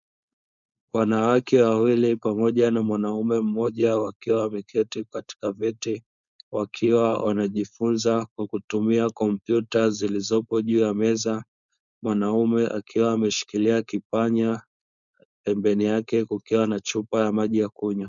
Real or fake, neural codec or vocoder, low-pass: fake; codec, 16 kHz, 4.8 kbps, FACodec; 7.2 kHz